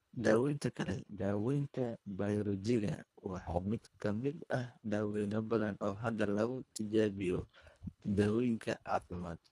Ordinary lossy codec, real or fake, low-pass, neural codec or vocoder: none; fake; none; codec, 24 kHz, 1.5 kbps, HILCodec